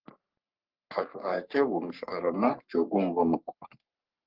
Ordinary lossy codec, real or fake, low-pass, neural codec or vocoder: Opus, 32 kbps; fake; 5.4 kHz; codec, 44.1 kHz, 3.4 kbps, Pupu-Codec